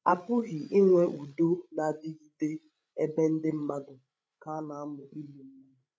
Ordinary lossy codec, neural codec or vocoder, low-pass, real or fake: none; codec, 16 kHz, 16 kbps, FreqCodec, larger model; none; fake